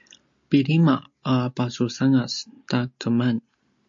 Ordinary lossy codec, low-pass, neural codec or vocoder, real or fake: MP3, 48 kbps; 7.2 kHz; none; real